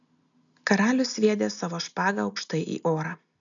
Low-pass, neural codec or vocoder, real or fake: 7.2 kHz; none; real